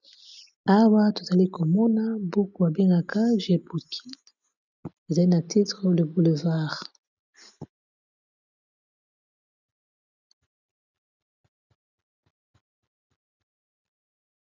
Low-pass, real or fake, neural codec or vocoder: 7.2 kHz; real; none